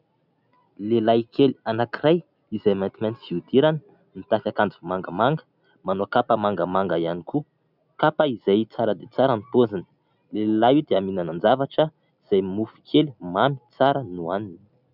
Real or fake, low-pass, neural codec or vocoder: real; 5.4 kHz; none